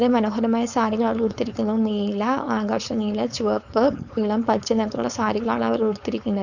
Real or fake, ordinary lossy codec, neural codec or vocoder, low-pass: fake; none; codec, 16 kHz, 4.8 kbps, FACodec; 7.2 kHz